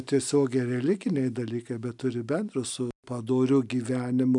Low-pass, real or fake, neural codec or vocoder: 10.8 kHz; real; none